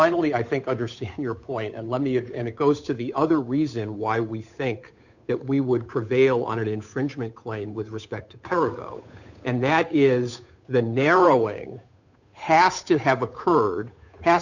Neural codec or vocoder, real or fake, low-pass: codec, 16 kHz, 8 kbps, FunCodec, trained on Chinese and English, 25 frames a second; fake; 7.2 kHz